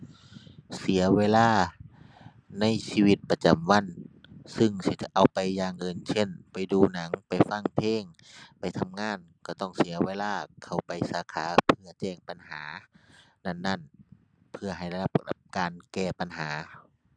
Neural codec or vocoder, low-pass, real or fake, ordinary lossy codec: none; 9.9 kHz; real; none